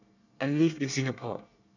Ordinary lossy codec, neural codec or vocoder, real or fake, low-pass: none; codec, 24 kHz, 1 kbps, SNAC; fake; 7.2 kHz